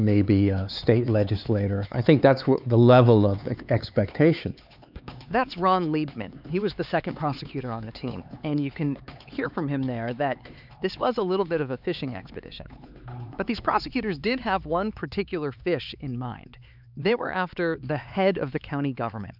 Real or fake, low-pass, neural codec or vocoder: fake; 5.4 kHz; codec, 16 kHz, 4 kbps, X-Codec, HuBERT features, trained on LibriSpeech